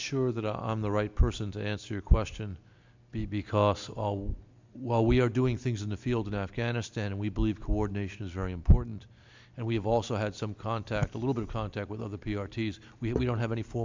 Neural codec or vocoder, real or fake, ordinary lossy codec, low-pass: none; real; MP3, 64 kbps; 7.2 kHz